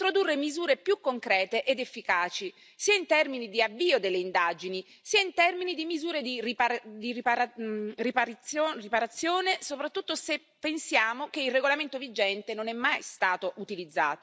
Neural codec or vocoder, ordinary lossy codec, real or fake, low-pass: none; none; real; none